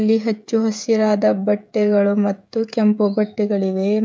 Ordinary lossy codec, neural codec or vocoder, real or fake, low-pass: none; codec, 16 kHz, 16 kbps, FreqCodec, smaller model; fake; none